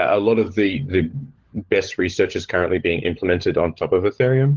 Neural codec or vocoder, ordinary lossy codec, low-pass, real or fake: codec, 16 kHz, 16 kbps, FunCodec, trained on LibriTTS, 50 frames a second; Opus, 16 kbps; 7.2 kHz; fake